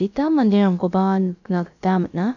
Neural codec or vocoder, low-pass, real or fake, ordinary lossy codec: codec, 16 kHz, about 1 kbps, DyCAST, with the encoder's durations; 7.2 kHz; fake; none